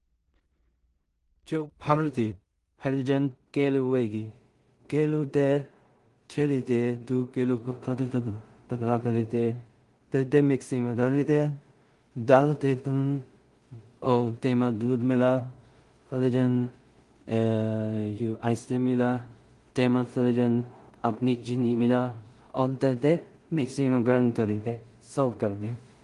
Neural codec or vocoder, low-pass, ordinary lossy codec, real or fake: codec, 16 kHz in and 24 kHz out, 0.4 kbps, LongCat-Audio-Codec, two codebook decoder; 10.8 kHz; Opus, 24 kbps; fake